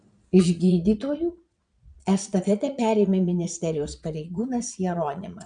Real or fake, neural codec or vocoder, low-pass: fake; vocoder, 22.05 kHz, 80 mel bands, Vocos; 9.9 kHz